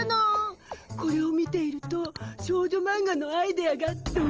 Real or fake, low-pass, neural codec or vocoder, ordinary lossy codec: real; 7.2 kHz; none; Opus, 24 kbps